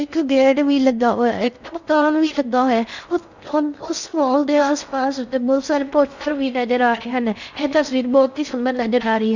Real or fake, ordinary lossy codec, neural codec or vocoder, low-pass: fake; none; codec, 16 kHz in and 24 kHz out, 0.6 kbps, FocalCodec, streaming, 4096 codes; 7.2 kHz